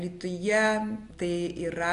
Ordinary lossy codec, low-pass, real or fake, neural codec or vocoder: AAC, 64 kbps; 10.8 kHz; real; none